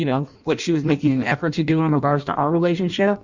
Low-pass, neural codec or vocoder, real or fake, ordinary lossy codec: 7.2 kHz; codec, 16 kHz in and 24 kHz out, 0.6 kbps, FireRedTTS-2 codec; fake; Opus, 64 kbps